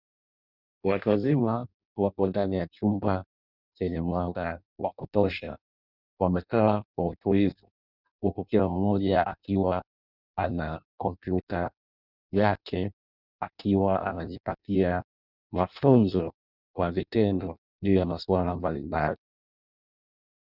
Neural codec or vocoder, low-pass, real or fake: codec, 16 kHz in and 24 kHz out, 0.6 kbps, FireRedTTS-2 codec; 5.4 kHz; fake